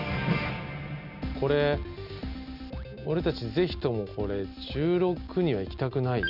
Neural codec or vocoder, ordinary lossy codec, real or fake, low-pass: none; none; real; 5.4 kHz